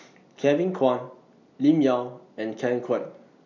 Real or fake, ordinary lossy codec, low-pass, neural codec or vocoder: real; none; 7.2 kHz; none